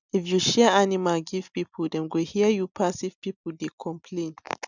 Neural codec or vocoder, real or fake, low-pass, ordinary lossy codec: none; real; 7.2 kHz; none